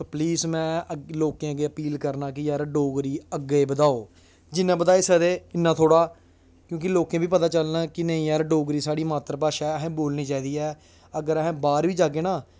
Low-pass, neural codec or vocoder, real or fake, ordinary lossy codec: none; none; real; none